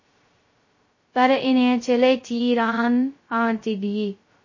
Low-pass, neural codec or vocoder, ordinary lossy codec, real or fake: 7.2 kHz; codec, 16 kHz, 0.2 kbps, FocalCodec; MP3, 32 kbps; fake